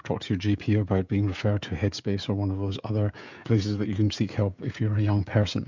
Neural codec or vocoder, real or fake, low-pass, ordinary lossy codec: codec, 16 kHz, 16 kbps, FreqCodec, smaller model; fake; 7.2 kHz; MP3, 64 kbps